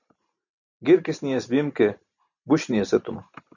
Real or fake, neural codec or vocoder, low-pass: real; none; 7.2 kHz